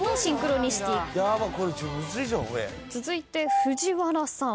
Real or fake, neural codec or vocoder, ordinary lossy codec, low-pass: real; none; none; none